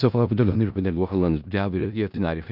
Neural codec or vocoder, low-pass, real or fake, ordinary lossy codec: codec, 16 kHz in and 24 kHz out, 0.4 kbps, LongCat-Audio-Codec, four codebook decoder; 5.4 kHz; fake; none